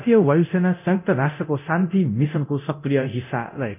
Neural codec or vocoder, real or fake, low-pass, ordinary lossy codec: codec, 24 kHz, 0.9 kbps, DualCodec; fake; 3.6 kHz; none